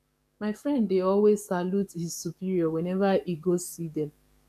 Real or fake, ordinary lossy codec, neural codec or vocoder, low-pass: fake; none; codec, 44.1 kHz, 7.8 kbps, DAC; 14.4 kHz